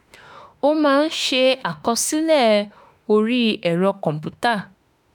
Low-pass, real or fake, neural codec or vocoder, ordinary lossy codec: 19.8 kHz; fake; autoencoder, 48 kHz, 32 numbers a frame, DAC-VAE, trained on Japanese speech; none